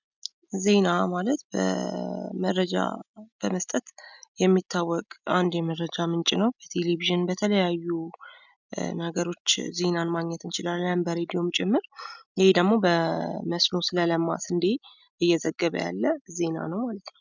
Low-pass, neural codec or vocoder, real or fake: 7.2 kHz; none; real